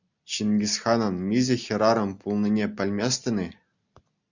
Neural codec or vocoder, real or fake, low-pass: none; real; 7.2 kHz